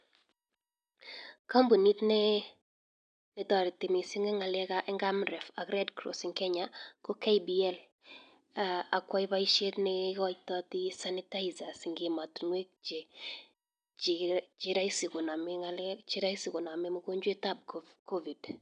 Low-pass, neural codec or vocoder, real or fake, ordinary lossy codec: 9.9 kHz; none; real; none